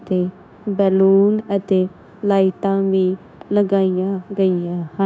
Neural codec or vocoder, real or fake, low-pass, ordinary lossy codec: codec, 16 kHz, 0.9 kbps, LongCat-Audio-Codec; fake; none; none